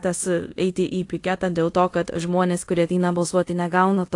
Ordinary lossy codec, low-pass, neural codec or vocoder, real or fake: AAC, 48 kbps; 10.8 kHz; codec, 24 kHz, 0.5 kbps, DualCodec; fake